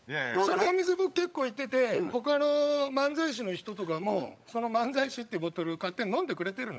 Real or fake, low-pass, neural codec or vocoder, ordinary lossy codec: fake; none; codec, 16 kHz, 16 kbps, FunCodec, trained on LibriTTS, 50 frames a second; none